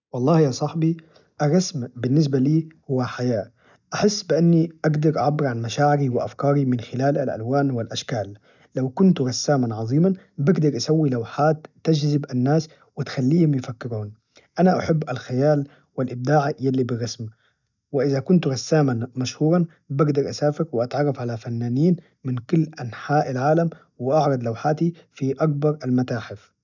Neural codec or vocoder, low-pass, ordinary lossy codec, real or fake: none; 7.2 kHz; none; real